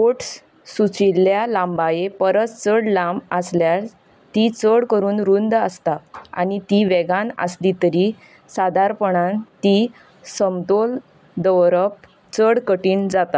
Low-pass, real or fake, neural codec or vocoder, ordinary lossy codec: none; real; none; none